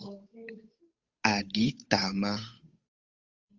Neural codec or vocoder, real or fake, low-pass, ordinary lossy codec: codec, 16 kHz, 8 kbps, FunCodec, trained on Chinese and English, 25 frames a second; fake; 7.2 kHz; Opus, 32 kbps